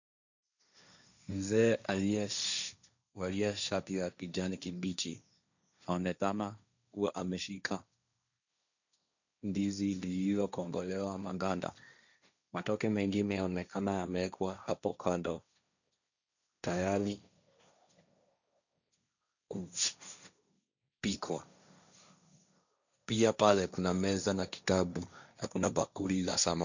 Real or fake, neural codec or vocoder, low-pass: fake; codec, 16 kHz, 1.1 kbps, Voila-Tokenizer; 7.2 kHz